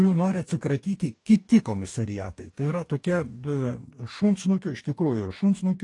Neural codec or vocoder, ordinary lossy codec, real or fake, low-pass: codec, 44.1 kHz, 2.6 kbps, DAC; AAC, 48 kbps; fake; 10.8 kHz